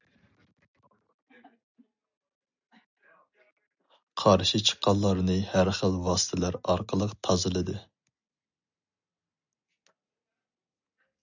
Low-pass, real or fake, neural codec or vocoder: 7.2 kHz; real; none